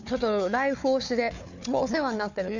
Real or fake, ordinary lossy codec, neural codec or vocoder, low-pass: fake; none; codec, 16 kHz, 4 kbps, FunCodec, trained on Chinese and English, 50 frames a second; 7.2 kHz